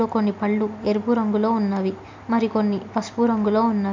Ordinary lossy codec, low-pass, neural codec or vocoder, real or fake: MP3, 64 kbps; 7.2 kHz; none; real